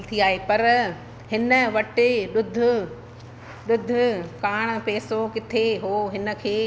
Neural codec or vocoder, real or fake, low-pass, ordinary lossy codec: none; real; none; none